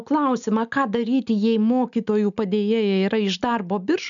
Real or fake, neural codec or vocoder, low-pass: real; none; 7.2 kHz